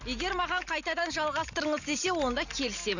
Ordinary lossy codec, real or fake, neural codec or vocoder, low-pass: none; real; none; 7.2 kHz